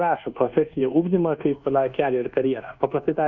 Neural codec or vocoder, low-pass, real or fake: codec, 24 kHz, 1.2 kbps, DualCodec; 7.2 kHz; fake